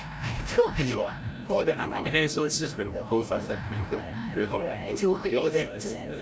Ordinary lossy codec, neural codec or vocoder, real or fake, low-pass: none; codec, 16 kHz, 0.5 kbps, FreqCodec, larger model; fake; none